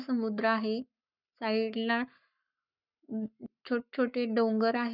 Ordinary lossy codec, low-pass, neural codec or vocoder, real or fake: none; 5.4 kHz; codec, 44.1 kHz, 7.8 kbps, Pupu-Codec; fake